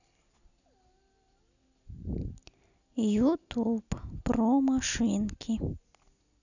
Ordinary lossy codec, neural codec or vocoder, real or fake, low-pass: none; none; real; 7.2 kHz